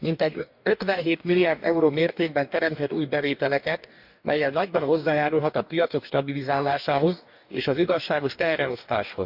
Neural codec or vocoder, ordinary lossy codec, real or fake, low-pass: codec, 44.1 kHz, 2.6 kbps, DAC; none; fake; 5.4 kHz